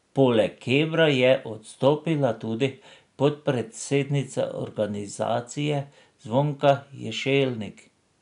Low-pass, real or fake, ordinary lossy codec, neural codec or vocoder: 10.8 kHz; real; none; none